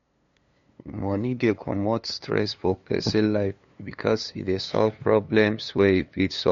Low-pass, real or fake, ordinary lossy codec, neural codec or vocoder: 7.2 kHz; fake; MP3, 48 kbps; codec, 16 kHz, 2 kbps, FunCodec, trained on LibriTTS, 25 frames a second